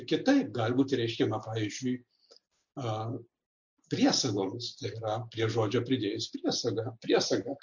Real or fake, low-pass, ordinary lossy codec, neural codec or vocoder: real; 7.2 kHz; MP3, 48 kbps; none